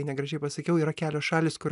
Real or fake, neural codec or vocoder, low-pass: real; none; 10.8 kHz